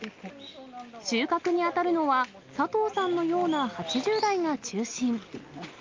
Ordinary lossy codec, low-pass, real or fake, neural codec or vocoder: Opus, 24 kbps; 7.2 kHz; real; none